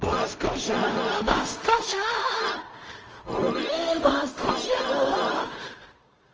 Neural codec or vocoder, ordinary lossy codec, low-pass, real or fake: codec, 16 kHz in and 24 kHz out, 0.4 kbps, LongCat-Audio-Codec, two codebook decoder; Opus, 24 kbps; 7.2 kHz; fake